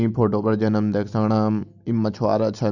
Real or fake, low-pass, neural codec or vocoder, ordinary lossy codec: real; 7.2 kHz; none; none